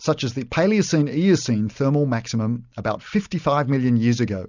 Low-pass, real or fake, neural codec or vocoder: 7.2 kHz; real; none